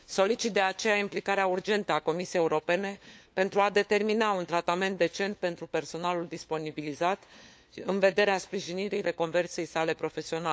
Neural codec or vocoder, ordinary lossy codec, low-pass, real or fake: codec, 16 kHz, 4 kbps, FunCodec, trained on LibriTTS, 50 frames a second; none; none; fake